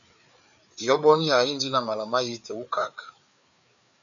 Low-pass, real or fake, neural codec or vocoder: 7.2 kHz; fake; codec, 16 kHz, 4 kbps, FreqCodec, larger model